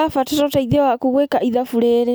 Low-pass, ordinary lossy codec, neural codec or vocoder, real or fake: none; none; none; real